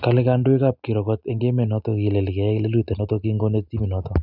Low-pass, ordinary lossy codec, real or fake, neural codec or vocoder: 5.4 kHz; none; real; none